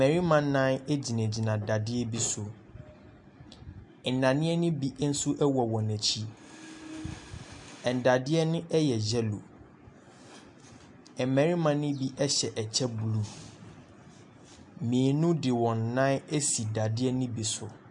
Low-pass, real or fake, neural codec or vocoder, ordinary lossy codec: 10.8 kHz; real; none; MP3, 64 kbps